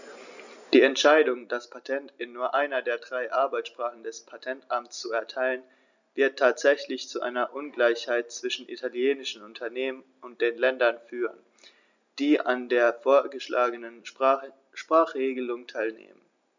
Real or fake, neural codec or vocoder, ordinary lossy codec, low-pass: real; none; none; 7.2 kHz